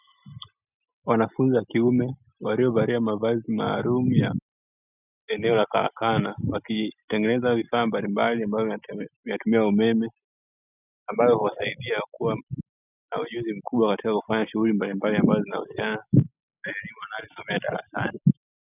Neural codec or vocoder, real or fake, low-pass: none; real; 3.6 kHz